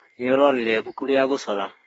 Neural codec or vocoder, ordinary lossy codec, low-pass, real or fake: codec, 32 kHz, 1.9 kbps, SNAC; AAC, 24 kbps; 14.4 kHz; fake